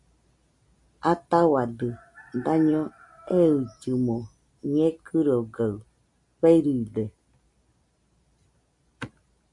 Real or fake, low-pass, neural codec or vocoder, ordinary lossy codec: real; 10.8 kHz; none; MP3, 64 kbps